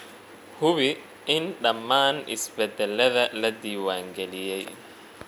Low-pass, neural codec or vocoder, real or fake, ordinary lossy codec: 19.8 kHz; none; real; none